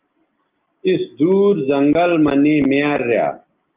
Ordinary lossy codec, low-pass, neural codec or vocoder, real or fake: Opus, 32 kbps; 3.6 kHz; none; real